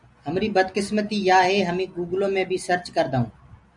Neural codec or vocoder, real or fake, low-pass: none; real; 10.8 kHz